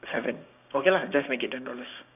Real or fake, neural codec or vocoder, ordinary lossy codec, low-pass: fake; codec, 16 kHz, 6 kbps, DAC; none; 3.6 kHz